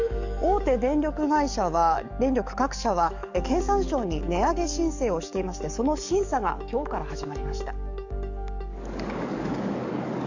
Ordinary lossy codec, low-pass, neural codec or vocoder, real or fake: none; 7.2 kHz; codec, 44.1 kHz, 7.8 kbps, DAC; fake